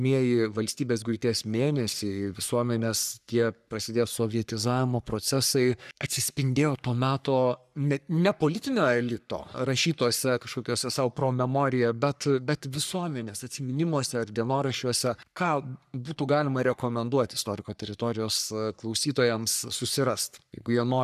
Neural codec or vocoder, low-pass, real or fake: codec, 44.1 kHz, 3.4 kbps, Pupu-Codec; 14.4 kHz; fake